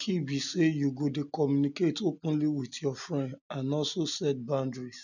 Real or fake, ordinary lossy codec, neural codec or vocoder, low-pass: real; none; none; 7.2 kHz